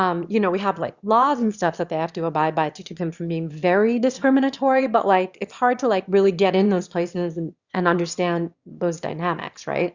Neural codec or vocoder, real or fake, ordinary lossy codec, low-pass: autoencoder, 22.05 kHz, a latent of 192 numbers a frame, VITS, trained on one speaker; fake; Opus, 64 kbps; 7.2 kHz